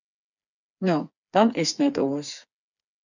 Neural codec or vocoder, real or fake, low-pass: codec, 16 kHz, 4 kbps, FreqCodec, smaller model; fake; 7.2 kHz